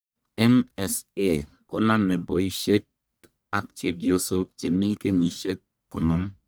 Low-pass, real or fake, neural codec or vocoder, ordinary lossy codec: none; fake; codec, 44.1 kHz, 1.7 kbps, Pupu-Codec; none